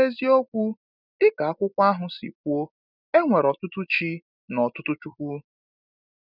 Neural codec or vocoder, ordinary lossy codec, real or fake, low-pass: none; none; real; 5.4 kHz